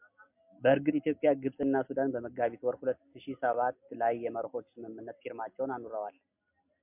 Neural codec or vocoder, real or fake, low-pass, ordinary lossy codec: none; real; 3.6 kHz; MP3, 32 kbps